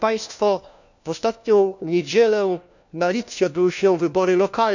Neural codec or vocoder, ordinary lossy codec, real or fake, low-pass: codec, 16 kHz, 1 kbps, FunCodec, trained on LibriTTS, 50 frames a second; none; fake; 7.2 kHz